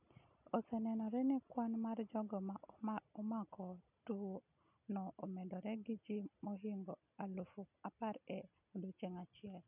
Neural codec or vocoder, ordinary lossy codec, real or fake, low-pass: none; none; real; 3.6 kHz